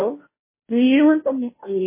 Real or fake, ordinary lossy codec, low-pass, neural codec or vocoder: fake; MP3, 16 kbps; 3.6 kHz; codec, 16 kHz, 0.5 kbps, FunCodec, trained on Chinese and English, 25 frames a second